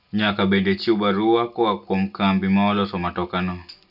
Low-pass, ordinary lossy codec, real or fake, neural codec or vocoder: 5.4 kHz; none; real; none